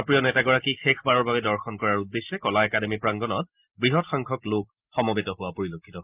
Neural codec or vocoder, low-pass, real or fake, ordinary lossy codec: none; 3.6 kHz; real; Opus, 24 kbps